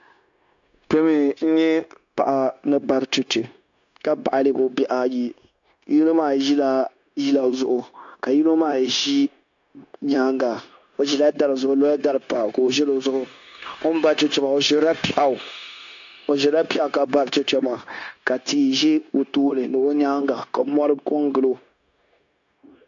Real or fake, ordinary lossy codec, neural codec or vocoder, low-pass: fake; AAC, 64 kbps; codec, 16 kHz, 0.9 kbps, LongCat-Audio-Codec; 7.2 kHz